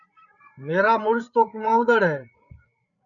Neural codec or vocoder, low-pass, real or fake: codec, 16 kHz, 8 kbps, FreqCodec, larger model; 7.2 kHz; fake